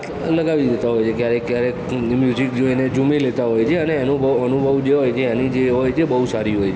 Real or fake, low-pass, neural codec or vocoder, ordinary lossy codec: real; none; none; none